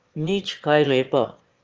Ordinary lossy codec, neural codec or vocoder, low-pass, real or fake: Opus, 24 kbps; autoencoder, 22.05 kHz, a latent of 192 numbers a frame, VITS, trained on one speaker; 7.2 kHz; fake